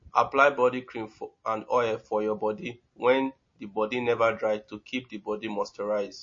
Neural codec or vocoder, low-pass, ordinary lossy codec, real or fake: none; 7.2 kHz; MP3, 32 kbps; real